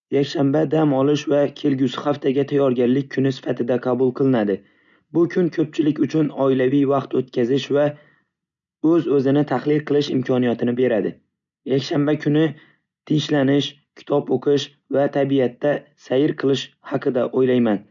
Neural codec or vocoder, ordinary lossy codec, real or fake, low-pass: none; none; real; 7.2 kHz